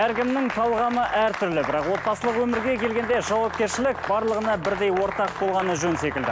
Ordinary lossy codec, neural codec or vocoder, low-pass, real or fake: none; none; none; real